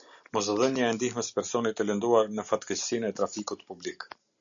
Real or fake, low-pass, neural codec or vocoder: real; 7.2 kHz; none